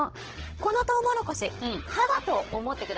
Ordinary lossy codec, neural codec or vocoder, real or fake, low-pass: Opus, 16 kbps; codec, 16 kHz, 16 kbps, FunCodec, trained on Chinese and English, 50 frames a second; fake; 7.2 kHz